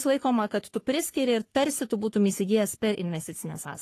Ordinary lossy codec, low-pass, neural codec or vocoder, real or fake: AAC, 48 kbps; 14.4 kHz; codec, 44.1 kHz, 3.4 kbps, Pupu-Codec; fake